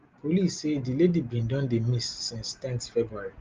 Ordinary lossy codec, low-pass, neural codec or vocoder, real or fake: Opus, 32 kbps; 7.2 kHz; none; real